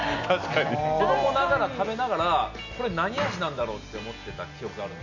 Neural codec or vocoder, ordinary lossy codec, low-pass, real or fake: none; none; 7.2 kHz; real